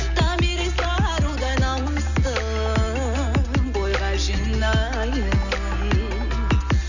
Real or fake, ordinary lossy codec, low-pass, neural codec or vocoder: real; none; 7.2 kHz; none